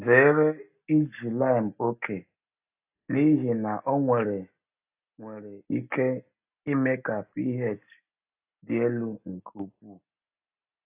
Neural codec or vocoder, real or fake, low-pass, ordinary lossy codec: none; real; 3.6 kHz; AAC, 32 kbps